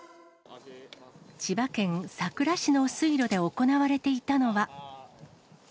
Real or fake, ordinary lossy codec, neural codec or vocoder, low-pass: real; none; none; none